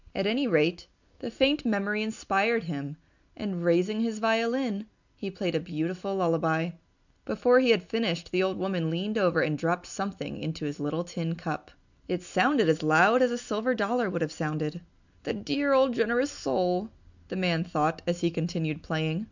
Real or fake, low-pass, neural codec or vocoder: real; 7.2 kHz; none